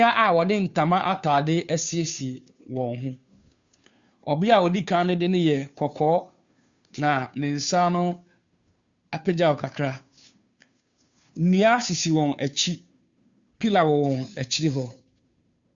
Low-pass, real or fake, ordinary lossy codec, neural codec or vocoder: 7.2 kHz; fake; Opus, 64 kbps; codec, 16 kHz, 2 kbps, FunCodec, trained on Chinese and English, 25 frames a second